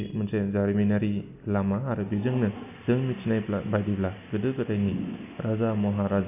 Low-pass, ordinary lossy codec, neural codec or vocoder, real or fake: 3.6 kHz; none; none; real